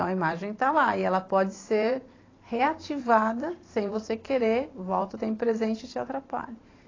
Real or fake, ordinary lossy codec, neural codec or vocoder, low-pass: fake; AAC, 32 kbps; vocoder, 44.1 kHz, 128 mel bands every 512 samples, BigVGAN v2; 7.2 kHz